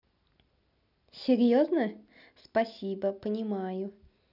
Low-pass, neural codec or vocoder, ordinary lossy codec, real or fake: 5.4 kHz; none; none; real